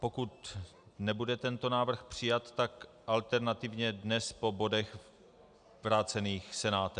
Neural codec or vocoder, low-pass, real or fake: none; 9.9 kHz; real